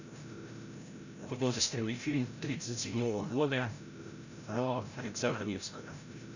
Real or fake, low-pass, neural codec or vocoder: fake; 7.2 kHz; codec, 16 kHz, 0.5 kbps, FreqCodec, larger model